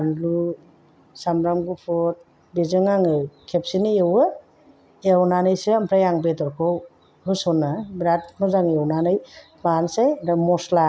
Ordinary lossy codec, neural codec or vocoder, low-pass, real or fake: none; none; none; real